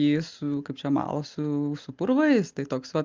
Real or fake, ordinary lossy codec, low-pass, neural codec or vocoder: real; Opus, 32 kbps; 7.2 kHz; none